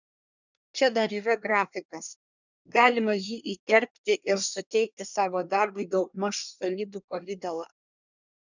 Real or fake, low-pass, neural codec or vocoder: fake; 7.2 kHz; codec, 24 kHz, 1 kbps, SNAC